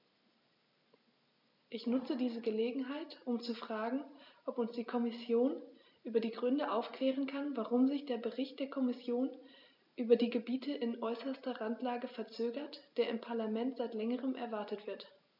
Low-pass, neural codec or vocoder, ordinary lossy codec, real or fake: 5.4 kHz; none; none; real